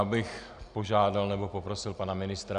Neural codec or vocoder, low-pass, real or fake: none; 9.9 kHz; real